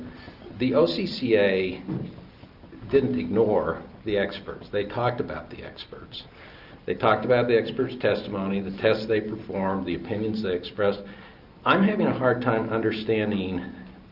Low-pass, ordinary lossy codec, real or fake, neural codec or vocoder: 5.4 kHz; Opus, 24 kbps; real; none